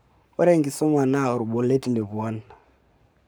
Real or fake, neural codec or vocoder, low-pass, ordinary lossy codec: fake; codec, 44.1 kHz, 7.8 kbps, Pupu-Codec; none; none